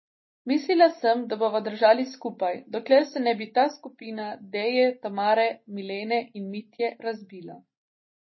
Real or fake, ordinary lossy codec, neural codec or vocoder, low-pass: real; MP3, 24 kbps; none; 7.2 kHz